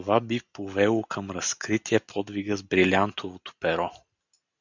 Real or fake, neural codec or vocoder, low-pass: real; none; 7.2 kHz